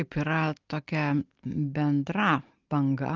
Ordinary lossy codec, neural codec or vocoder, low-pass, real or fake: Opus, 24 kbps; none; 7.2 kHz; real